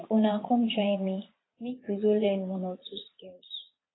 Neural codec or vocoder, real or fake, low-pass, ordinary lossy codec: vocoder, 22.05 kHz, 80 mel bands, Vocos; fake; 7.2 kHz; AAC, 16 kbps